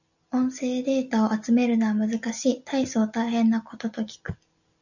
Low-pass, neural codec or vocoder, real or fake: 7.2 kHz; none; real